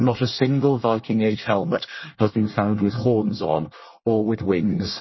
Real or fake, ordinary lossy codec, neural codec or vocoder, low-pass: fake; MP3, 24 kbps; codec, 24 kHz, 1 kbps, SNAC; 7.2 kHz